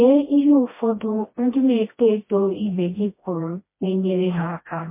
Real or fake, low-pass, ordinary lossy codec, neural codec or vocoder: fake; 3.6 kHz; MP3, 24 kbps; codec, 16 kHz, 1 kbps, FreqCodec, smaller model